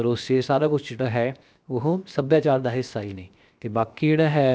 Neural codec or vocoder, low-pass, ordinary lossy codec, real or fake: codec, 16 kHz, 0.7 kbps, FocalCodec; none; none; fake